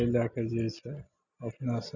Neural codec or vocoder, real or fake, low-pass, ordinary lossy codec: none; real; 7.2 kHz; none